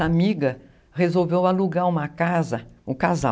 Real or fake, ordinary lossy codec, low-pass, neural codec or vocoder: real; none; none; none